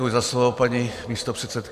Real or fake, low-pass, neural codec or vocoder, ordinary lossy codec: fake; 14.4 kHz; vocoder, 44.1 kHz, 128 mel bands every 256 samples, BigVGAN v2; Opus, 64 kbps